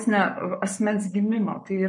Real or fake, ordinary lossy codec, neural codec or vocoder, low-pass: fake; MP3, 48 kbps; codec, 44.1 kHz, 7.8 kbps, Pupu-Codec; 10.8 kHz